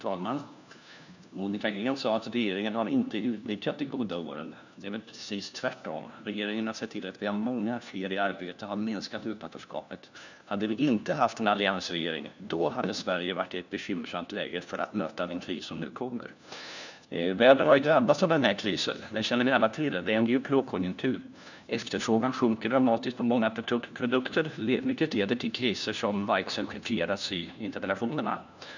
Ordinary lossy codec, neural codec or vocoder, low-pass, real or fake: none; codec, 16 kHz, 1 kbps, FunCodec, trained on LibriTTS, 50 frames a second; 7.2 kHz; fake